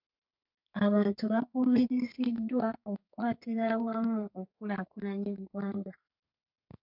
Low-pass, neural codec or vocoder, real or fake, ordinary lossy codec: 5.4 kHz; codec, 32 kHz, 1.9 kbps, SNAC; fake; MP3, 48 kbps